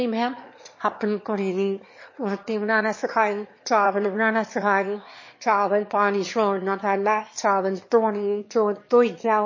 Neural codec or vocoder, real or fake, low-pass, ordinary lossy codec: autoencoder, 22.05 kHz, a latent of 192 numbers a frame, VITS, trained on one speaker; fake; 7.2 kHz; MP3, 32 kbps